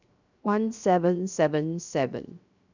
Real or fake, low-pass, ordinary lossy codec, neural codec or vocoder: fake; 7.2 kHz; none; codec, 16 kHz, 0.7 kbps, FocalCodec